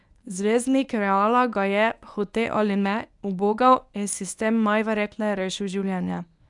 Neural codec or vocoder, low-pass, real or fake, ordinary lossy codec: codec, 24 kHz, 0.9 kbps, WavTokenizer, medium speech release version 1; 10.8 kHz; fake; none